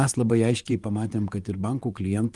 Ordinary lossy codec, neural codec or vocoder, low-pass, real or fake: Opus, 32 kbps; vocoder, 24 kHz, 100 mel bands, Vocos; 10.8 kHz; fake